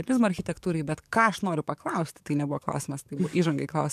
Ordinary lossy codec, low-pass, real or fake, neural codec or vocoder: MP3, 96 kbps; 14.4 kHz; fake; codec, 44.1 kHz, 7.8 kbps, DAC